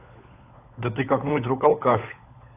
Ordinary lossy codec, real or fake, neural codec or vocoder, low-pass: AAC, 24 kbps; fake; codec, 16 kHz, 16 kbps, FunCodec, trained on LibriTTS, 50 frames a second; 3.6 kHz